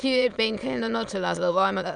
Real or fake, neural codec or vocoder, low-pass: fake; autoencoder, 22.05 kHz, a latent of 192 numbers a frame, VITS, trained on many speakers; 9.9 kHz